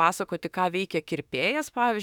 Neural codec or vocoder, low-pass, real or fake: autoencoder, 48 kHz, 32 numbers a frame, DAC-VAE, trained on Japanese speech; 19.8 kHz; fake